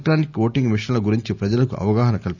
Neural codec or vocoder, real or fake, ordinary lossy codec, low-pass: none; real; none; 7.2 kHz